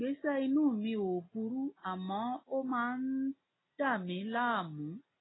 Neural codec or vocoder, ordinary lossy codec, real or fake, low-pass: none; AAC, 16 kbps; real; 7.2 kHz